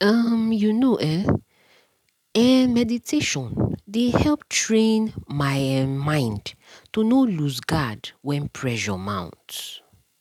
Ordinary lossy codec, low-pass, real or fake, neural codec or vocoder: none; 19.8 kHz; real; none